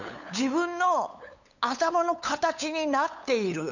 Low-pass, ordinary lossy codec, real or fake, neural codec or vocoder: 7.2 kHz; none; fake; codec, 16 kHz, 8 kbps, FunCodec, trained on LibriTTS, 25 frames a second